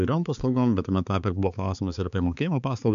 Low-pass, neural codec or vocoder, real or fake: 7.2 kHz; codec, 16 kHz, 4 kbps, X-Codec, HuBERT features, trained on balanced general audio; fake